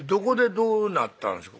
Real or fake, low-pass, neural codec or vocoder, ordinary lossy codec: real; none; none; none